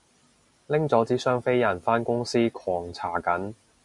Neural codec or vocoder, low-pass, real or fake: none; 10.8 kHz; real